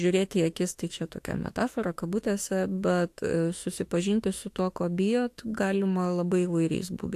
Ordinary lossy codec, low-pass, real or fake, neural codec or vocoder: AAC, 64 kbps; 14.4 kHz; fake; autoencoder, 48 kHz, 32 numbers a frame, DAC-VAE, trained on Japanese speech